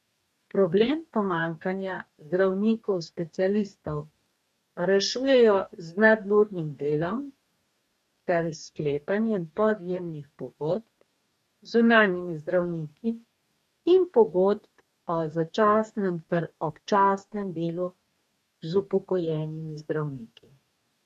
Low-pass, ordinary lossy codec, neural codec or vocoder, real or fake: 14.4 kHz; MP3, 64 kbps; codec, 44.1 kHz, 2.6 kbps, DAC; fake